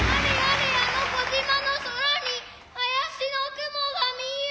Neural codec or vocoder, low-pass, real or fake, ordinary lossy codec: none; none; real; none